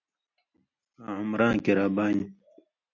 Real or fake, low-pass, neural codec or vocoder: real; 7.2 kHz; none